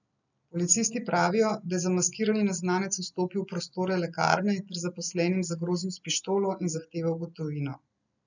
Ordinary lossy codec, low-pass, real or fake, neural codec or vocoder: none; 7.2 kHz; real; none